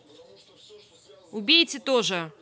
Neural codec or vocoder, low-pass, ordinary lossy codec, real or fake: none; none; none; real